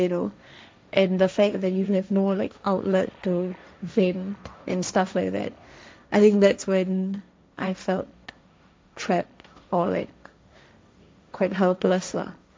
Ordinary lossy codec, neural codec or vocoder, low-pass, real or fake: none; codec, 16 kHz, 1.1 kbps, Voila-Tokenizer; none; fake